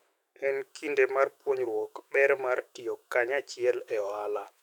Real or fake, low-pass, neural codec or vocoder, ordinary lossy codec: fake; 19.8 kHz; autoencoder, 48 kHz, 128 numbers a frame, DAC-VAE, trained on Japanese speech; none